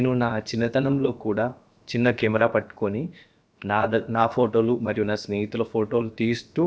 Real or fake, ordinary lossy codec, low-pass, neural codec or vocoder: fake; none; none; codec, 16 kHz, about 1 kbps, DyCAST, with the encoder's durations